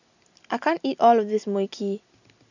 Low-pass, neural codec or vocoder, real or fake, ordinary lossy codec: 7.2 kHz; none; real; none